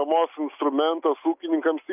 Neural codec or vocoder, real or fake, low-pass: none; real; 3.6 kHz